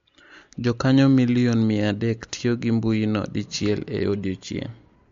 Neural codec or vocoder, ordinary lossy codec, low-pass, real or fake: none; MP3, 48 kbps; 7.2 kHz; real